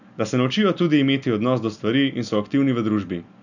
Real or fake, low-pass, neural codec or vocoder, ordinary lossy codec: real; 7.2 kHz; none; none